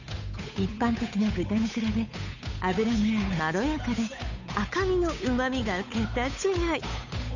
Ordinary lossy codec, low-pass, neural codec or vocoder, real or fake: none; 7.2 kHz; codec, 16 kHz, 8 kbps, FunCodec, trained on Chinese and English, 25 frames a second; fake